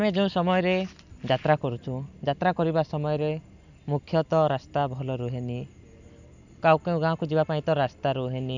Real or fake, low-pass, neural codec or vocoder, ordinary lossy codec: real; 7.2 kHz; none; none